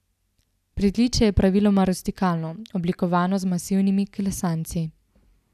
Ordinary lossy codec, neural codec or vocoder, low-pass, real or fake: none; none; 14.4 kHz; real